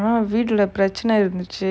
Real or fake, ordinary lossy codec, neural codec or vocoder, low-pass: real; none; none; none